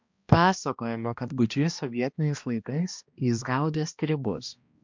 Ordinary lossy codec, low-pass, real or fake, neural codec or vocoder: MP3, 64 kbps; 7.2 kHz; fake; codec, 16 kHz, 1 kbps, X-Codec, HuBERT features, trained on balanced general audio